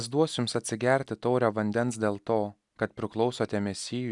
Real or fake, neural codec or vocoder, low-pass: real; none; 10.8 kHz